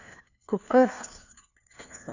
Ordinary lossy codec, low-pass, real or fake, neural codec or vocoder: none; 7.2 kHz; fake; codec, 16 kHz, 1 kbps, FunCodec, trained on LibriTTS, 50 frames a second